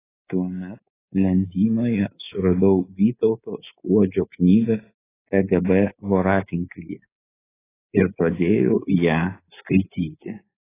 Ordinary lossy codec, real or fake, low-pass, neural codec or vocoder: AAC, 16 kbps; fake; 3.6 kHz; codec, 24 kHz, 3.1 kbps, DualCodec